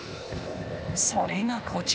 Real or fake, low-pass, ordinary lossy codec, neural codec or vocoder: fake; none; none; codec, 16 kHz, 0.8 kbps, ZipCodec